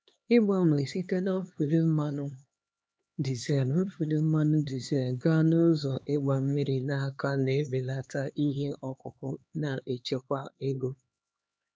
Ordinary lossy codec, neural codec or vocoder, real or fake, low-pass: none; codec, 16 kHz, 2 kbps, X-Codec, HuBERT features, trained on LibriSpeech; fake; none